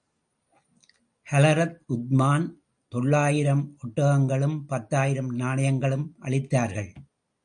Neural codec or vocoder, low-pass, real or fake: none; 9.9 kHz; real